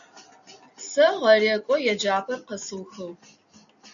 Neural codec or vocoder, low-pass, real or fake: none; 7.2 kHz; real